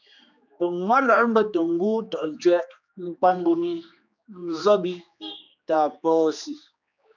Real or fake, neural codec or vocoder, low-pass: fake; codec, 16 kHz, 2 kbps, X-Codec, HuBERT features, trained on general audio; 7.2 kHz